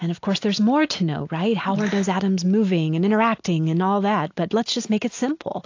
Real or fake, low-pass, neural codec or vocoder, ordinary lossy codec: real; 7.2 kHz; none; AAC, 48 kbps